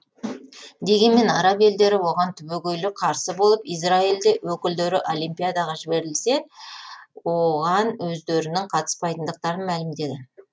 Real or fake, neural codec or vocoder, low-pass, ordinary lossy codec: real; none; none; none